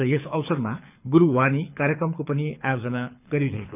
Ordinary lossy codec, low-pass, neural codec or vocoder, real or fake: none; 3.6 kHz; codec, 24 kHz, 6 kbps, HILCodec; fake